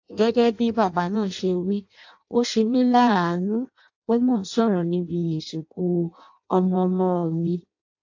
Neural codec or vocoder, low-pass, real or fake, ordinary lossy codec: codec, 16 kHz in and 24 kHz out, 0.6 kbps, FireRedTTS-2 codec; 7.2 kHz; fake; AAC, 48 kbps